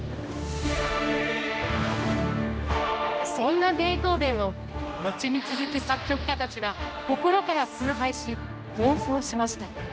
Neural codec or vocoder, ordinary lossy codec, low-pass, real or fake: codec, 16 kHz, 1 kbps, X-Codec, HuBERT features, trained on general audio; none; none; fake